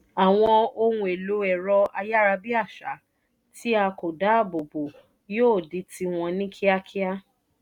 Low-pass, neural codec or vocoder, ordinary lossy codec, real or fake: 19.8 kHz; none; none; real